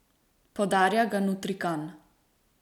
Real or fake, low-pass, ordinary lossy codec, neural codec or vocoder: real; 19.8 kHz; none; none